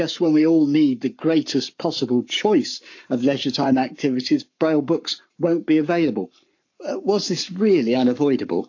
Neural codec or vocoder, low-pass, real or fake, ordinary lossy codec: codec, 44.1 kHz, 7.8 kbps, Pupu-Codec; 7.2 kHz; fake; AAC, 48 kbps